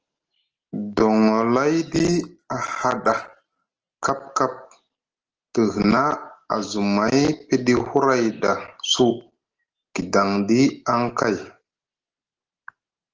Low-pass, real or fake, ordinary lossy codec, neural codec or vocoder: 7.2 kHz; real; Opus, 16 kbps; none